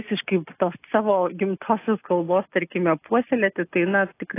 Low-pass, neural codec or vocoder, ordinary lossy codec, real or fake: 3.6 kHz; none; AAC, 24 kbps; real